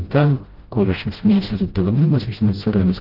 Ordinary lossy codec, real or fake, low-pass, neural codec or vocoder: Opus, 16 kbps; fake; 5.4 kHz; codec, 16 kHz, 0.5 kbps, FreqCodec, smaller model